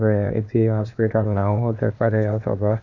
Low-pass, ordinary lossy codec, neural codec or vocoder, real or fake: 7.2 kHz; none; codec, 16 kHz, 0.8 kbps, ZipCodec; fake